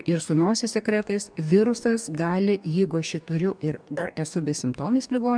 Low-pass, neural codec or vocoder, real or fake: 9.9 kHz; codec, 44.1 kHz, 2.6 kbps, DAC; fake